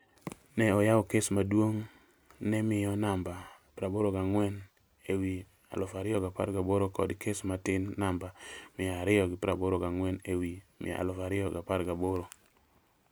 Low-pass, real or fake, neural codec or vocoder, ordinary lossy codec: none; real; none; none